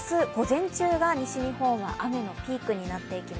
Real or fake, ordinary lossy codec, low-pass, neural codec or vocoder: real; none; none; none